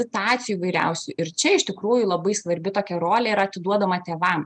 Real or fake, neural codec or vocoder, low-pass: real; none; 9.9 kHz